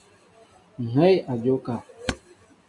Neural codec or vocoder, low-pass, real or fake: none; 10.8 kHz; real